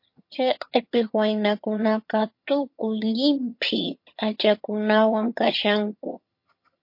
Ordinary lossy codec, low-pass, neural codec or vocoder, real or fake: MP3, 32 kbps; 5.4 kHz; vocoder, 22.05 kHz, 80 mel bands, HiFi-GAN; fake